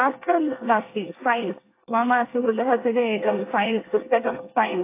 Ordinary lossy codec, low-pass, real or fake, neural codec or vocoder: AAC, 24 kbps; 3.6 kHz; fake; codec, 24 kHz, 1 kbps, SNAC